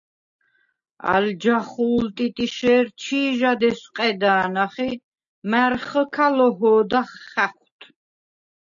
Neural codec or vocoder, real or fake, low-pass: none; real; 7.2 kHz